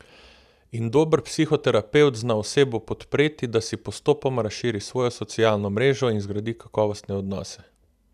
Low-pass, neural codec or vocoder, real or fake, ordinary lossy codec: 14.4 kHz; none; real; none